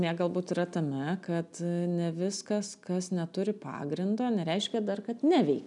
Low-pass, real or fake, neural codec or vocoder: 10.8 kHz; real; none